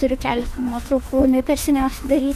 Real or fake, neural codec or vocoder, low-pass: fake; autoencoder, 48 kHz, 32 numbers a frame, DAC-VAE, trained on Japanese speech; 14.4 kHz